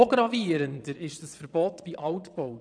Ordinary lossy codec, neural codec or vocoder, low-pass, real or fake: none; vocoder, 22.05 kHz, 80 mel bands, Vocos; 9.9 kHz; fake